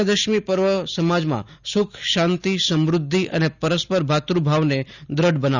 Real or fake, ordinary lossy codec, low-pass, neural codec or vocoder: real; none; 7.2 kHz; none